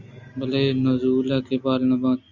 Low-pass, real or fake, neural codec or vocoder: 7.2 kHz; real; none